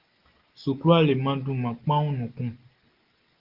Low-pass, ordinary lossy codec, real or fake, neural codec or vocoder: 5.4 kHz; Opus, 16 kbps; real; none